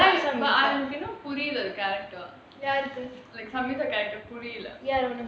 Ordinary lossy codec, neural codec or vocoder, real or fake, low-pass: none; none; real; none